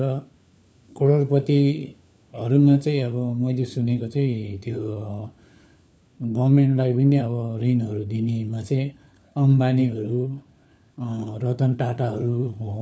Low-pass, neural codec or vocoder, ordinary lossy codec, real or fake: none; codec, 16 kHz, 4 kbps, FunCodec, trained on LibriTTS, 50 frames a second; none; fake